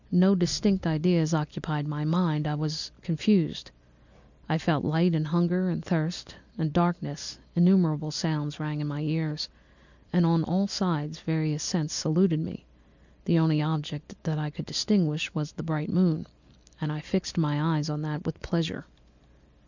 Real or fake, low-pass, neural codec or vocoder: real; 7.2 kHz; none